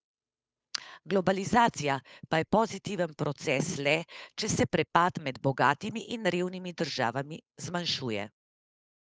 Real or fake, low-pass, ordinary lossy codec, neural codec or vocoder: fake; none; none; codec, 16 kHz, 8 kbps, FunCodec, trained on Chinese and English, 25 frames a second